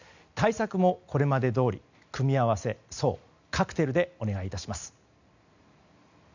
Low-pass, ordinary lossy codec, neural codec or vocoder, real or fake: 7.2 kHz; none; none; real